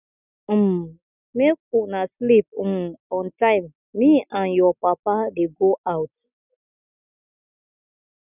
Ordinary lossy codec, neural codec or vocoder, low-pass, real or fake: none; none; 3.6 kHz; real